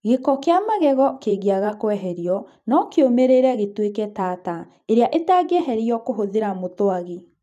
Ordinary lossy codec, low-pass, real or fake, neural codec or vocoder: none; 14.4 kHz; fake; vocoder, 44.1 kHz, 128 mel bands every 512 samples, BigVGAN v2